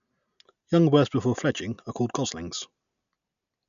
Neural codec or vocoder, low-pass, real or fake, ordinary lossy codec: none; 7.2 kHz; real; MP3, 96 kbps